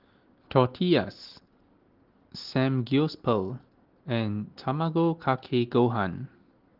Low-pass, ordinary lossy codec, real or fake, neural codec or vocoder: 5.4 kHz; Opus, 16 kbps; real; none